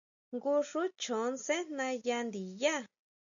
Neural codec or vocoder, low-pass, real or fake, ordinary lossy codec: none; 7.2 kHz; real; MP3, 96 kbps